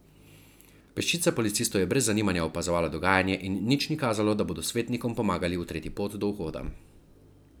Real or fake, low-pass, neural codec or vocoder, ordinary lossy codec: real; none; none; none